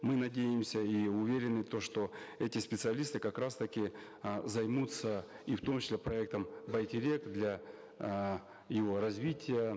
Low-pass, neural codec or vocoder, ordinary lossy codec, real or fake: none; none; none; real